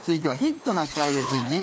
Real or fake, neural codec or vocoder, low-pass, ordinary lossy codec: fake; codec, 16 kHz, 2 kbps, FreqCodec, larger model; none; none